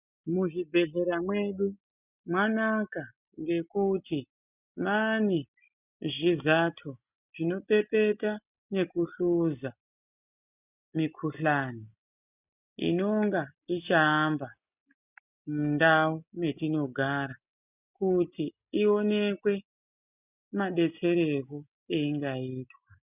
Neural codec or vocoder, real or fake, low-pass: none; real; 3.6 kHz